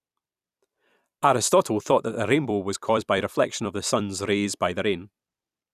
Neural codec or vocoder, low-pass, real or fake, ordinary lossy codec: vocoder, 44.1 kHz, 128 mel bands every 256 samples, BigVGAN v2; 14.4 kHz; fake; none